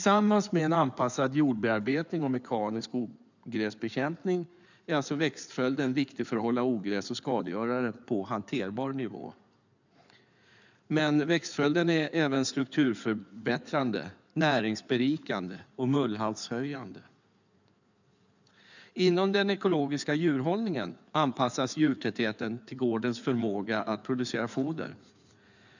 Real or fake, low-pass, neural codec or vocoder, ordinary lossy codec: fake; 7.2 kHz; codec, 16 kHz in and 24 kHz out, 2.2 kbps, FireRedTTS-2 codec; none